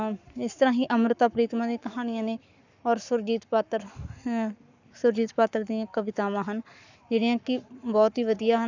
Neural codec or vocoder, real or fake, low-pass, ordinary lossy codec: codec, 44.1 kHz, 7.8 kbps, Pupu-Codec; fake; 7.2 kHz; none